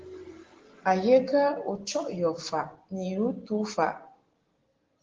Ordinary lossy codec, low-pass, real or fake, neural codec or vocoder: Opus, 16 kbps; 7.2 kHz; real; none